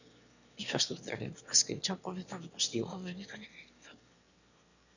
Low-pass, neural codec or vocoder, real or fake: 7.2 kHz; autoencoder, 22.05 kHz, a latent of 192 numbers a frame, VITS, trained on one speaker; fake